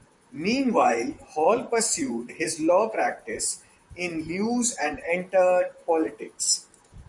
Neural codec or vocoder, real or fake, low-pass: vocoder, 44.1 kHz, 128 mel bands, Pupu-Vocoder; fake; 10.8 kHz